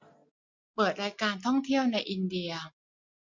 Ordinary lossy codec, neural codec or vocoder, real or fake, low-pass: MP3, 48 kbps; none; real; 7.2 kHz